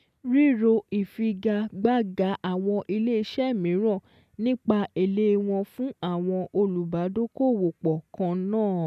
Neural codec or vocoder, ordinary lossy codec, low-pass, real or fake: none; none; 14.4 kHz; real